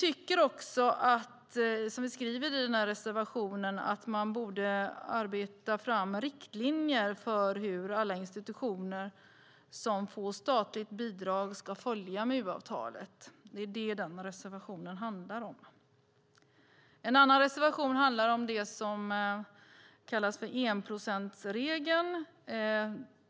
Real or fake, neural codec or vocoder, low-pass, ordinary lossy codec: real; none; none; none